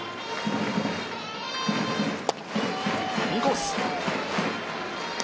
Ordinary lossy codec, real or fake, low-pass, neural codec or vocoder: none; real; none; none